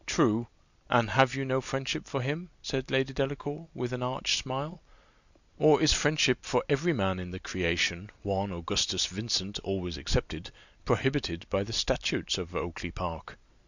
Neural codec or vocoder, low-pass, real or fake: vocoder, 44.1 kHz, 128 mel bands every 256 samples, BigVGAN v2; 7.2 kHz; fake